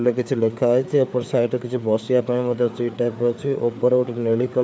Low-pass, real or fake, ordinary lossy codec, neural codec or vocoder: none; fake; none; codec, 16 kHz, 4 kbps, FreqCodec, larger model